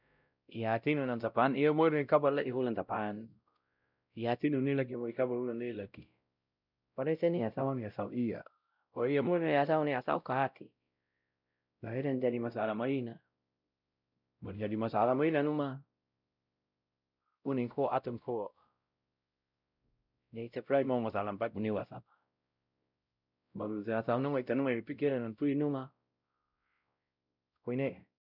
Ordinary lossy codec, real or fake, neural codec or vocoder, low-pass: none; fake; codec, 16 kHz, 0.5 kbps, X-Codec, WavLM features, trained on Multilingual LibriSpeech; 5.4 kHz